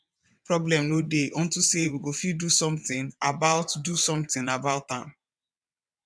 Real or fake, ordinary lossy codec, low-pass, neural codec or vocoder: fake; none; none; vocoder, 22.05 kHz, 80 mel bands, WaveNeXt